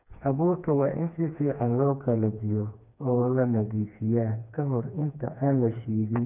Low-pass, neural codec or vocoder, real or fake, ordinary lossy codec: 3.6 kHz; codec, 16 kHz, 2 kbps, FreqCodec, smaller model; fake; none